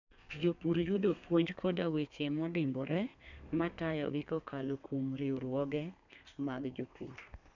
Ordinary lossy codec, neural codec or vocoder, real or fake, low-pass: none; codec, 32 kHz, 1.9 kbps, SNAC; fake; 7.2 kHz